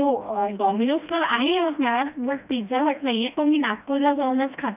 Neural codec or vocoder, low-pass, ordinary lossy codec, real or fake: codec, 16 kHz, 1 kbps, FreqCodec, smaller model; 3.6 kHz; none; fake